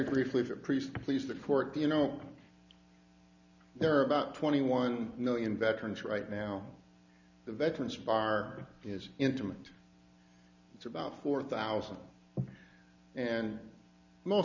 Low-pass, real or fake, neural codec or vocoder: 7.2 kHz; real; none